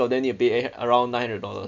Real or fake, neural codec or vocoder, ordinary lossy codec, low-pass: real; none; none; 7.2 kHz